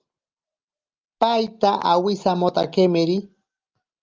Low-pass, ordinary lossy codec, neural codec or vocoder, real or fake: 7.2 kHz; Opus, 24 kbps; none; real